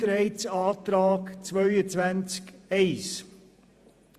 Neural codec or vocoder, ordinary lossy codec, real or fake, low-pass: vocoder, 48 kHz, 128 mel bands, Vocos; Opus, 64 kbps; fake; 14.4 kHz